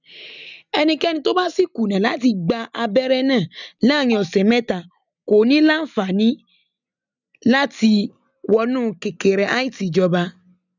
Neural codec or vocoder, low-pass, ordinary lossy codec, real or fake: none; 7.2 kHz; none; real